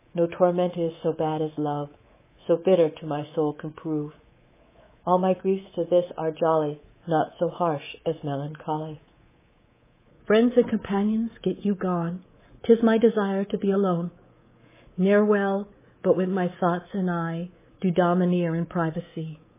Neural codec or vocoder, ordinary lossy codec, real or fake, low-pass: codec, 24 kHz, 3.1 kbps, DualCodec; MP3, 16 kbps; fake; 3.6 kHz